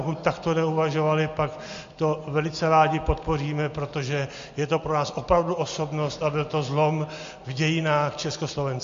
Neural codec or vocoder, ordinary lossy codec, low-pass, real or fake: none; MP3, 48 kbps; 7.2 kHz; real